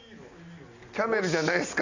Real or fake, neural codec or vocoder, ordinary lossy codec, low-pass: real; none; Opus, 64 kbps; 7.2 kHz